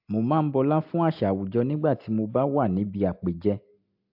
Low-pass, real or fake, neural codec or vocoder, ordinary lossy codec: 5.4 kHz; real; none; AAC, 48 kbps